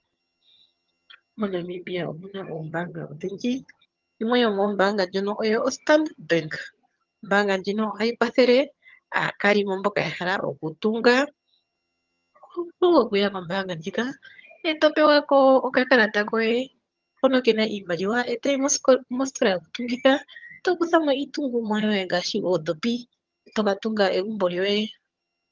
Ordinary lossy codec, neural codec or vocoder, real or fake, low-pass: Opus, 32 kbps; vocoder, 22.05 kHz, 80 mel bands, HiFi-GAN; fake; 7.2 kHz